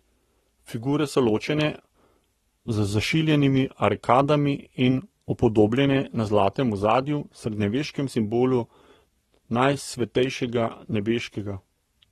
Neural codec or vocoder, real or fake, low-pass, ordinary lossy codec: codec, 44.1 kHz, 7.8 kbps, Pupu-Codec; fake; 19.8 kHz; AAC, 32 kbps